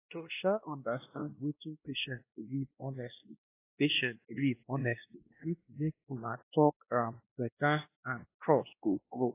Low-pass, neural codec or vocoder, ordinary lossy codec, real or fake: 3.6 kHz; codec, 16 kHz, 1 kbps, X-Codec, WavLM features, trained on Multilingual LibriSpeech; AAC, 24 kbps; fake